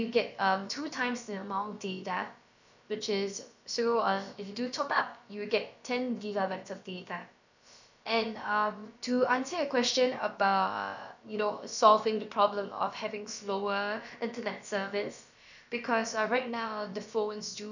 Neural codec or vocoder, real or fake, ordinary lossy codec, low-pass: codec, 16 kHz, about 1 kbps, DyCAST, with the encoder's durations; fake; none; 7.2 kHz